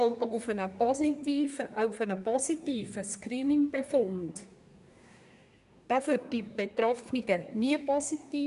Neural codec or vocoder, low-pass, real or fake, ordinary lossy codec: codec, 24 kHz, 1 kbps, SNAC; 10.8 kHz; fake; none